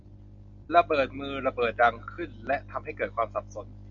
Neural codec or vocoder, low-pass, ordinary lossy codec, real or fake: none; 7.2 kHz; AAC, 64 kbps; real